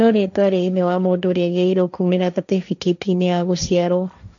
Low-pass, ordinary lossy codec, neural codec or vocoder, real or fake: 7.2 kHz; none; codec, 16 kHz, 1.1 kbps, Voila-Tokenizer; fake